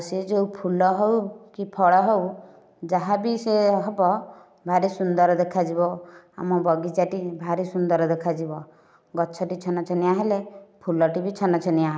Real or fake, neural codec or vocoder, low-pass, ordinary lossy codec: real; none; none; none